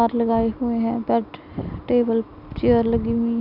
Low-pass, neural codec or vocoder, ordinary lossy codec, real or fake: 5.4 kHz; none; none; real